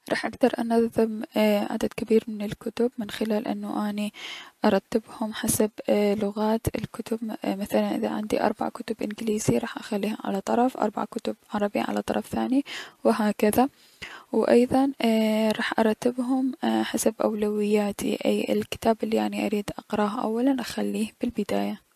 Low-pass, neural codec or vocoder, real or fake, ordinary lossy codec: 14.4 kHz; none; real; MP3, 64 kbps